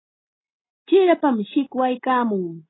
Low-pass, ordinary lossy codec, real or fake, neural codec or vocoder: 7.2 kHz; AAC, 16 kbps; real; none